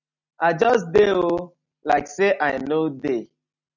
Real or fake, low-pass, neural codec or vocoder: real; 7.2 kHz; none